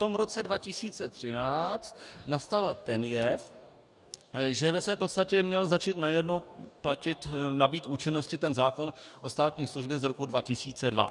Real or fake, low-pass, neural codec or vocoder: fake; 10.8 kHz; codec, 44.1 kHz, 2.6 kbps, DAC